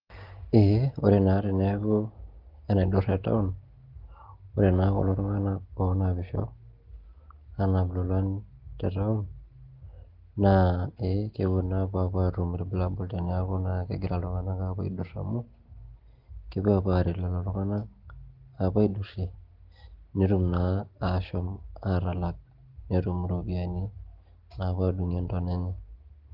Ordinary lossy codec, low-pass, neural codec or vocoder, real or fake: Opus, 16 kbps; 5.4 kHz; none; real